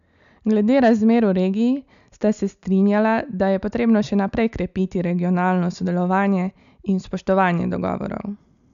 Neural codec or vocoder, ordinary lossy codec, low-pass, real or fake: none; MP3, 96 kbps; 7.2 kHz; real